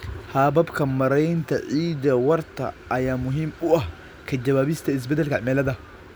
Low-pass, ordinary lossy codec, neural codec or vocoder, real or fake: none; none; none; real